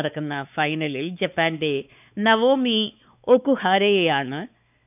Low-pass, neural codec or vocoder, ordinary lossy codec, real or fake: 3.6 kHz; codec, 16 kHz, 4 kbps, X-Codec, WavLM features, trained on Multilingual LibriSpeech; none; fake